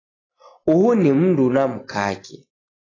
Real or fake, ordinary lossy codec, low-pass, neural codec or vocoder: real; AAC, 32 kbps; 7.2 kHz; none